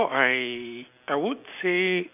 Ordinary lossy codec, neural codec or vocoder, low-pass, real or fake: none; none; 3.6 kHz; real